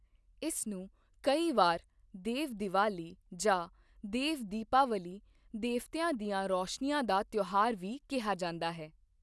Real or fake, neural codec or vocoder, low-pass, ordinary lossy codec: real; none; none; none